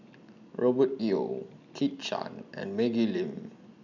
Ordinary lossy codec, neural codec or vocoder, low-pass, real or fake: none; none; 7.2 kHz; real